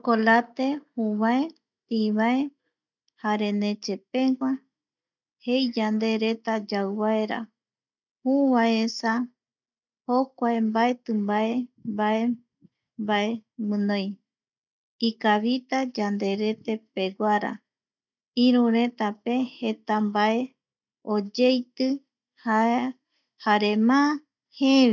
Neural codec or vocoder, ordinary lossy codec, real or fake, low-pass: none; none; real; 7.2 kHz